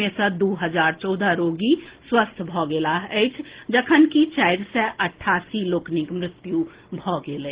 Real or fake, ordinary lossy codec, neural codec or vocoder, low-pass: real; Opus, 16 kbps; none; 3.6 kHz